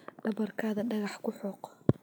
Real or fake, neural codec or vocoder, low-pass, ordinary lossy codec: real; none; none; none